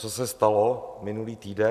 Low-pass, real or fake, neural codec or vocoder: 14.4 kHz; real; none